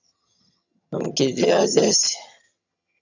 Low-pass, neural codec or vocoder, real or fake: 7.2 kHz; vocoder, 22.05 kHz, 80 mel bands, HiFi-GAN; fake